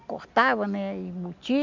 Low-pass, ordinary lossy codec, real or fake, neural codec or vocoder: 7.2 kHz; MP3, 64 kbps; real; none